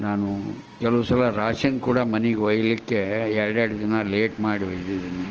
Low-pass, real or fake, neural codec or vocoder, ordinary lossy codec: 7.2 kHz; real; none; Opus, 16 kbps